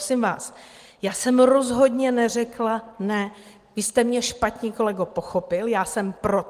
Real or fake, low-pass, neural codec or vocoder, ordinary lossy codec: real; 14.4 kHz; none; Opus, 24 kbps